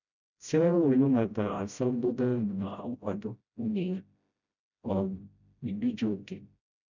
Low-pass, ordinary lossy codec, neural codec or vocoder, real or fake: 7.2 kHz; none; codec, 16 kHz, 0.5 kbps, FreqCodec, smaller model; fake